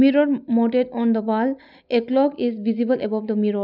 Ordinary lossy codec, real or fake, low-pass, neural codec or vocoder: none; real; 5.4 kHz; none